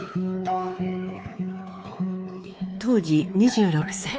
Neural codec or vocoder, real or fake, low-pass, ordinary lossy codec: codec, 16 kHz, 4 kbps, X-Codec, WavLM features, trained on Multilingual LibriSpeech; fake; none; none